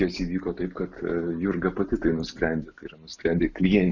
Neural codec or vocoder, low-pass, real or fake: none; 7.2 kHz; real